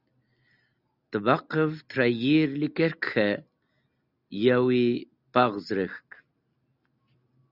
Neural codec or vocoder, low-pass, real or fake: none; 5.4 kHz; real